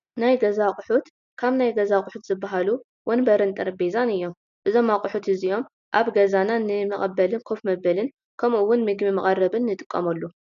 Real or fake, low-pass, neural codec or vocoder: real; 7.2 kHz; none